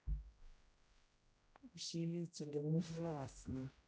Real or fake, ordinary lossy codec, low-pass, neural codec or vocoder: fake; none; none; codec, 16 kHz, 0.5 kbps, X-Codec, HuBERT features, trained on general audio